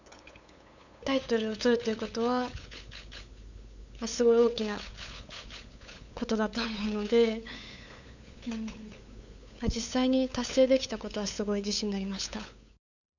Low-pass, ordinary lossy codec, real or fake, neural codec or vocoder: 7.2 kHz; none; fake; codec, 16 kHz, 8 kbps, FunCodec, trained on LibriTTS, 25 frames a second